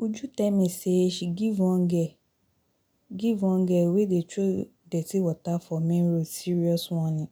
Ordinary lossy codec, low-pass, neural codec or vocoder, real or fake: none; 19.8 kHz; none; real